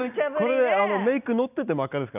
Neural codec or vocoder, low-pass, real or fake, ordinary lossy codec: none; 3.6 kHz; real; none